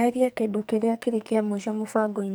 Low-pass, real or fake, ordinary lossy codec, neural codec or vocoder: none; fake; none; codec, 44.1 kHz, 2.6 kbps, SNAC